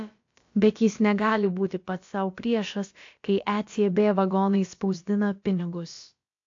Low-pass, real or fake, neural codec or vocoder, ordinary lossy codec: 7.2 kHz; fake; codec, 16 kHz, about 1 kbps, DyCAST, with the encoder's durations; AAC, 48 kbps